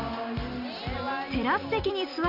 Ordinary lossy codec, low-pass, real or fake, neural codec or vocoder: none; 5.4 kHz; real; none